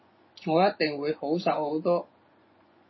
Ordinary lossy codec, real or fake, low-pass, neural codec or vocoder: MP3, 24 kbps; fake; 7.2 kHz; vocoder, 24 kHz, 100 mel bands, Vocos